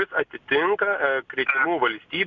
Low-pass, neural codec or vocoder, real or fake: 7.2 kHz; none; real